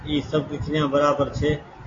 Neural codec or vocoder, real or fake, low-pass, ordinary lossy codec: none; real; 7.2 kHz; AAC, 32 kbps